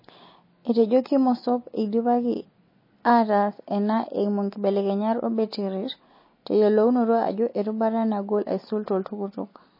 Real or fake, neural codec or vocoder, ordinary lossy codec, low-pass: real; none; MP3, 24 kbps; 5.4 kHz